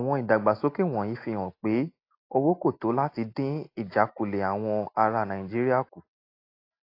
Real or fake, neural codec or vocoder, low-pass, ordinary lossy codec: real; none; 5.4 kHz; AAC, 32 kbps